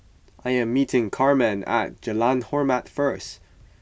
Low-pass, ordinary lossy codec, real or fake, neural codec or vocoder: none; none; real; none